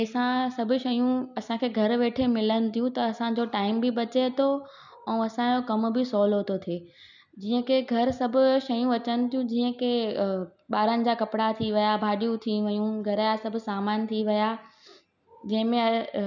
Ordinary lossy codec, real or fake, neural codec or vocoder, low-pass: none; real; none; 7.2 kHz